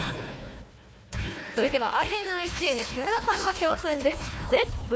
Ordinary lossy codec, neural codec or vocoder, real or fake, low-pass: none; codec, 16 kHz, 1 kbps, FunCodec, trained on Chinese and English, 50 frames a second; fake; none